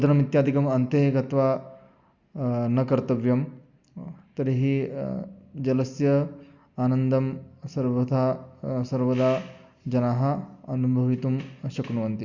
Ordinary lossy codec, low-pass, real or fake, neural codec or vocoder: Opus, 64 kbps; 7.2 kHz; real; none